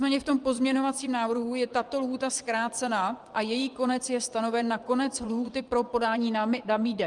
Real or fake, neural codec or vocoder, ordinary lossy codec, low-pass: fake; vocoder, 24 kHz, 100 mel bands, Vocos; Opus, 32 kbps; 10.8 kHz